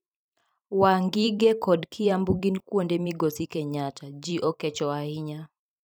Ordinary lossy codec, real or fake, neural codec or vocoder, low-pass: none; fake; vocoder, 44.1 kHz, 128 mel bands every 256 samples, BigVGAN v2; none